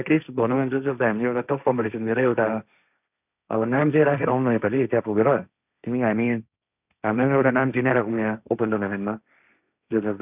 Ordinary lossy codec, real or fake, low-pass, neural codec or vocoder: none; fake; 3.6 kHz; codec, 16 kHz, 1.1 kbps, Voila-Tokenizer